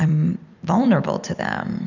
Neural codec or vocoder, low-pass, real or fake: vocoder, 44.1 kHz, 128 mel bands every 256 samples, BigVGAN v2; 7.2 kHz; fake